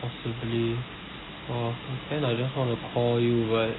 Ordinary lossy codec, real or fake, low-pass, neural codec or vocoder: AAC, 16 kbps; real; 7.2 kHz; none